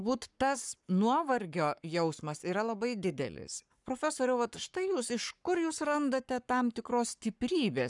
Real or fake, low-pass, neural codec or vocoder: fake; 10.8 kHz; codec, 44.1 kHz, 7.8 kbps, Pupu-Codec